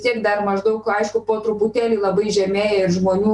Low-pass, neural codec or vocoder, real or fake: 10.8 kHz; none; real